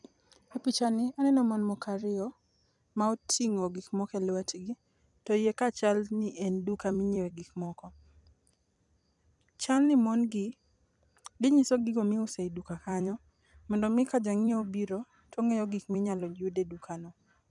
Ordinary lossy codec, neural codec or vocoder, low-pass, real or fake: none; vocoder, 44.1 kHz, 128 mel bands every 256 samples, BigVGAN v2; 10.8 kHz; fake